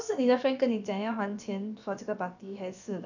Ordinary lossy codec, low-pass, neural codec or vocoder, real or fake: none; 7.2 kHz; codec, 16 kHz, about 1 kbps, DyCAST, with the encoder's durations; fake